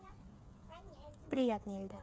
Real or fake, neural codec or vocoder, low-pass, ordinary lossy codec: fake; codec, 16 kHz, 8 kbps, FreqCodec, smaller model; none; none